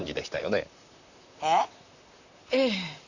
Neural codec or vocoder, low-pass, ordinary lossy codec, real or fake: vocoder, 44.1 kHz, 128 mel bands, Pupu-Vocoder; 7.2 kHz; none; fake